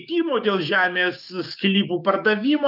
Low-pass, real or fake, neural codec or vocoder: 5.4 kHz; fake; codec, 16 kHz, 6 kbps, DAC